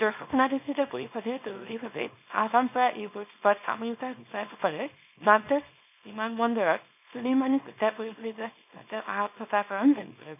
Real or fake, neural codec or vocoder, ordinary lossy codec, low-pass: fake; codec, 24 kHz, 0.9 kbps, WavTokenizer, small release; none; 3.6 kHz